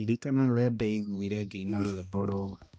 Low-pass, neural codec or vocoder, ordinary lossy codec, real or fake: none; codec, 16 kHz, 1 kbps, X-Codec, HuBERT features, trained on balanced general audio; none; fake